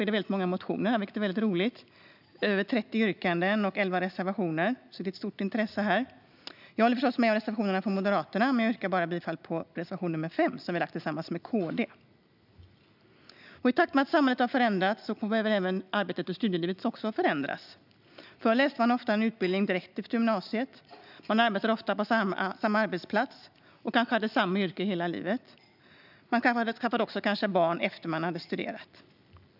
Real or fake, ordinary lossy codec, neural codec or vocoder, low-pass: real; none; none; 5.4 kHz